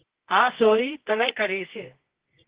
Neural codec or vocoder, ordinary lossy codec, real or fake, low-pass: codec, 24 kHz, 0.9 kbps, WavTokenizer, medium music audio release; Opus, 16 kbps; fake; 3.6 kHz